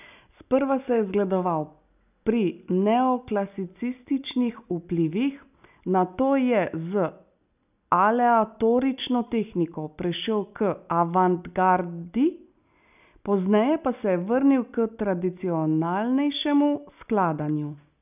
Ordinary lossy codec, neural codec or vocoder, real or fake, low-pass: none; none; real; 3.6 kHz